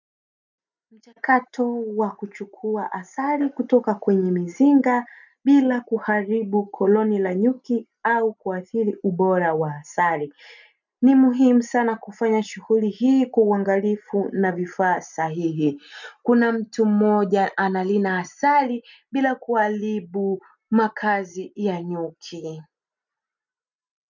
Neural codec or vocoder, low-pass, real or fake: none; 7.2 kHz; real